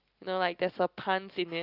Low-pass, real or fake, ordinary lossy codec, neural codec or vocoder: 5.4 kHz; real; Opus, 32 kbps; none